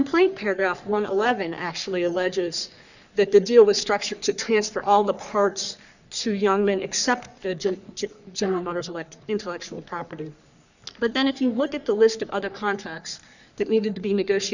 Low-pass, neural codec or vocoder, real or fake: 7.2 kHz; codec, 44.1 kHz, 3.4 kbps, Pupu-Codec; fake